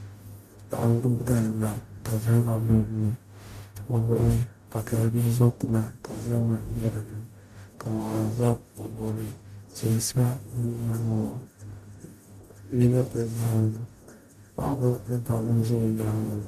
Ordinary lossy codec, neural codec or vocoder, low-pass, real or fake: MP3, 96 kbps; codec, 44.1 kHz, 0.9 kbps, DAC; 14.4 kHz; fake